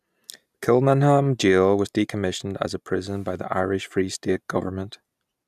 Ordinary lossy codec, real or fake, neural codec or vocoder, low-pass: none; real; none; 14.4 kHz